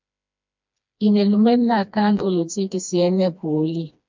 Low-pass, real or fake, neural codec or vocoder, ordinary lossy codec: 7.2 kHz; fake; codec, 16 kHz, 2 kbps, FreqCodec, smaller model; MP3, 64 kbps